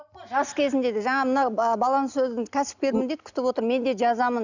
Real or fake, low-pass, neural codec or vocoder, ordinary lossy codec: real; 7.2 kHz; none; none